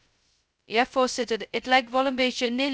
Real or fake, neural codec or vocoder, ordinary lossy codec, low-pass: fake; codec, 16 kHz, 0.2 kbps, FocalCodec; none; none